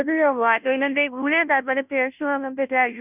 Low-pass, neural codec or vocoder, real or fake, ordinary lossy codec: 3.6 kHz; codec, 16 kHz, 0.5 kbps, FunCodec, trained on Chinese and English, 25 frames a second; fake; none